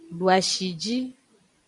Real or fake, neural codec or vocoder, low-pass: fake; vocoder, 44.1 kHz, 128 mel bands every 512 samples, BigVGAN v2; 10.8 kHz